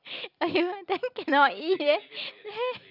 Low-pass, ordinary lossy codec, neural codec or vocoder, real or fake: 5.4 kHz; none; none; real